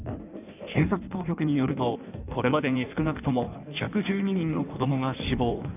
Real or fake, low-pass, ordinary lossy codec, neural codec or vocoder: fake; 3.6 kHz; none; codec, 16 kHz in and 24 kHz out, 1.1 kbps, FireRedTTS-2 codec